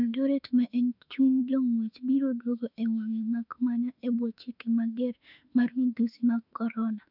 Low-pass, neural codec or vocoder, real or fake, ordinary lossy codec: 5.4 kHz; autoencoder, 48 kHz, 32 numbers a frame, DAC-VAE, trained on Japanese speech; fake; none